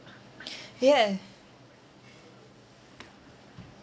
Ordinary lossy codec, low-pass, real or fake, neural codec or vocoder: none; none; real; none